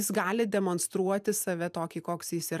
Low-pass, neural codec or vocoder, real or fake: 14.4 kHz; vocoder, 44.1 kHz, 128 mel bands every 512 samples, BigVGAN v2; fake